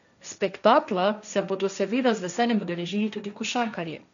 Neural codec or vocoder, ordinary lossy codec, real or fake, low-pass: codec, 16 kHz, 1.1 kbps, Voila-Tokenizer; none; fake; 7.2 kHz